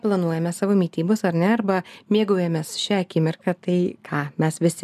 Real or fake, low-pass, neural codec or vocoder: real; 14.4 kHz; none